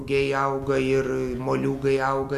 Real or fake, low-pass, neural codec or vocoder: real; 14.4 kHz; none